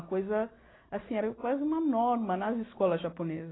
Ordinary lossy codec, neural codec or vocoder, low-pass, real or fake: AAC, 16 kbps; none; 7.2 kHz; real